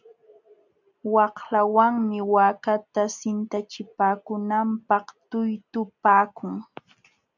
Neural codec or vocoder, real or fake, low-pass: none; real; 7.2 kHz